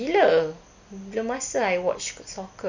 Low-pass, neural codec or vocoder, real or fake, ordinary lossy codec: 7.2 kHz; none; real; none